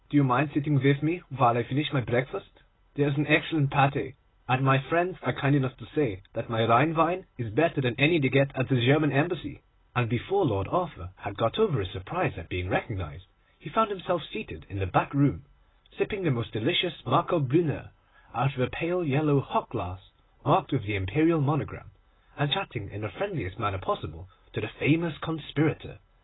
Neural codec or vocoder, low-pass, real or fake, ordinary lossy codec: none; 7.2 kHz; real; AAC, 16 kbps